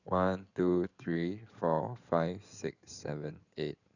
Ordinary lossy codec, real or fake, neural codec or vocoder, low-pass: none; fake; codec, 16 kHz in and 24 kHz out, 2.2 kbps, FireRedTTS-2 codec; 7.2 kHz